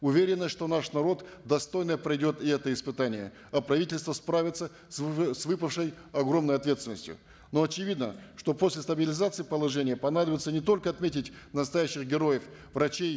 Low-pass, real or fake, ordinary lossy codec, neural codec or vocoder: none; real; none; none